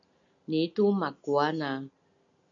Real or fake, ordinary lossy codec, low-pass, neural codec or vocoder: real; AAC, 64 kbps; 7.2 kHz; none